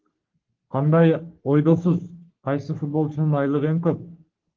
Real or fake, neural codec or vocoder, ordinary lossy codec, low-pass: fake; codec, 44.1 kHz, 3.4 kbps, Pupu-Codec; Opus, 16 kbps; 7.2 kHz